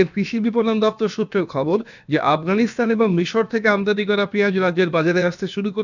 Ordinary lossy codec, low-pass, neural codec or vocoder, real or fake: none; 7.2 kHz; codec, 16 kHz, about 1 kbps, DyCAST, with the encoder's durations; fake